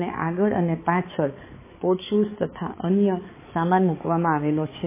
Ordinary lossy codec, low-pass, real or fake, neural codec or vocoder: MP3, 16 kbps; 3.6 kHz; fake; codec, 16 kHz, 4 kbps, X-Codec, HuBERT features, trained on balanced general audio